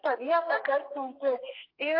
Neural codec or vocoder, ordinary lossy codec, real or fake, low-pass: codec, 32 kHz, 1.9 kbps, SNAC; AAC, 32 kbps; fake; 5.4 kHz